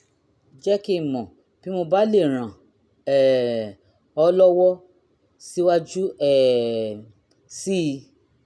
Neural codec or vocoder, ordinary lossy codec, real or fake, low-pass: none; none; real; none